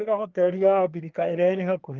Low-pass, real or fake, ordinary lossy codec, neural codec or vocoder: 7.2 kHz; fake; Opus, 32 kbps; codec, 24 kHz, 1 kbps, SNAC